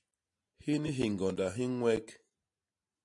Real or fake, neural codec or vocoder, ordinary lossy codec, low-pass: real; none; MP3, 48 kbps; 10.8 kHz